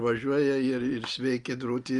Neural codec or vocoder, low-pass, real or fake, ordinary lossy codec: none; 10.8 kHz; real; Opus, 32 kbps